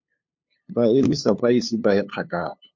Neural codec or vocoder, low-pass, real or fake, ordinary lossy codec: codec, 16 kHz, 2 kbps, FunCodec, trained on LibriTTS, 25 frames a second; 7.2 kHz; fake; MP3, 48 kbps